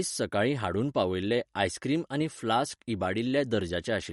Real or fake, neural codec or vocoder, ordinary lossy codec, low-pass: real; none; MP3, 48 kbps; 19.8 kHz